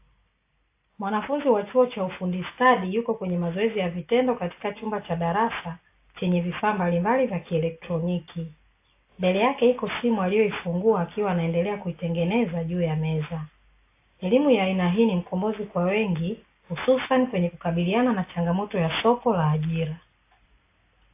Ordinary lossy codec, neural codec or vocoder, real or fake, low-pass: AAC, 24 kbps; none; real; 3.6 kHz